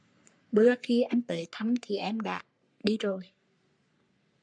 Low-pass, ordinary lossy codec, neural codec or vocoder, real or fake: 9.9 kHz; MP3, 96 kbps; codec, 44.1 kHz, 3.4 kbps, Pupu-Codec; fake